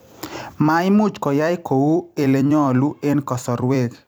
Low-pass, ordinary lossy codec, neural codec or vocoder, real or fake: none; none; vocoder, 44.1 kHz, 128 mel bands every 256 samples, BigVGAN v2; fake